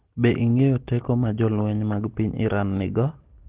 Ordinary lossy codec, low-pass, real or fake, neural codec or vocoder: Opus, 16 kbps; 3.6 kHz; real; none